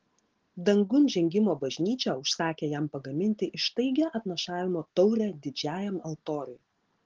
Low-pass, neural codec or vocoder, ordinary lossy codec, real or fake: 7.2 kHz; none; Opus, 16 kbps; real